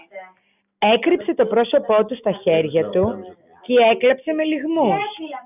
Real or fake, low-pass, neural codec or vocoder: real; 3.6 kHz; none